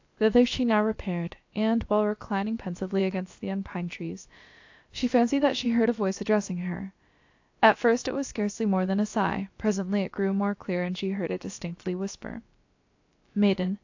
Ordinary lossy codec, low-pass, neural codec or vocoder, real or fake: AAC, 48 kbps; 7.2 kHz; codec, 16 kHz, about 1 kbps, DyCAST, with the encoder's durations; fake